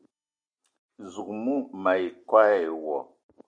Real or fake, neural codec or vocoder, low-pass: real; none; 9.9 kHz